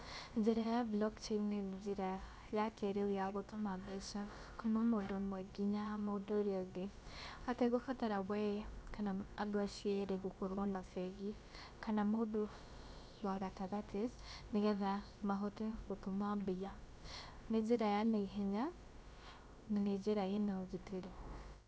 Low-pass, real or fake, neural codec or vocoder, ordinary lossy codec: none; fake; codec, 16 kHz, about 1 kbps, DyCAST, with the encoder's durations; none